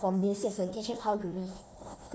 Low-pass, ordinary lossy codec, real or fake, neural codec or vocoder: none; none; fake; codec, 16 kHz, 1 kbps, FunCodec, trained on Chinese and English, 50 frames a second